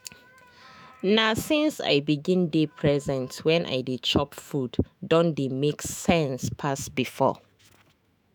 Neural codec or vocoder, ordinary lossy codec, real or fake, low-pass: autoencoder, 48 kHz, 128 numbers a frame, DAC-VAE, trained on Japanese speech; none; fake; none